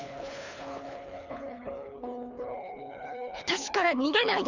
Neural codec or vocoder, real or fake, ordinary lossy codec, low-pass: codec, 24 kHz, 3 kbps, HILCodec; fake; none; 7.2 kHz